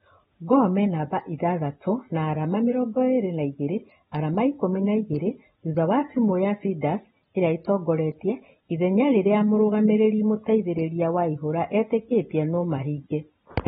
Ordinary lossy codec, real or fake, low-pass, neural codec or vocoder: AAC, 16 kbps; real; 19.8 kHz; none